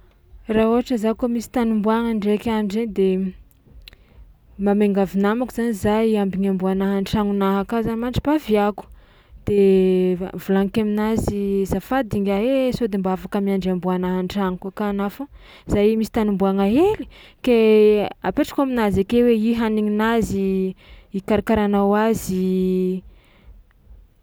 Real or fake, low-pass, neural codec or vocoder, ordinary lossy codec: real; none; none; none